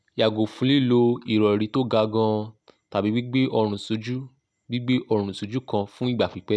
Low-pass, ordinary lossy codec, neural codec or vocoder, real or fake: none; none; none; real